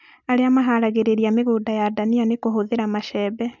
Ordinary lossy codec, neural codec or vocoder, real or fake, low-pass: none; none; real; 7.2 kHz